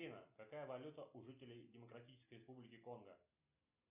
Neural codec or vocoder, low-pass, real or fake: none; 3.6 kHz; real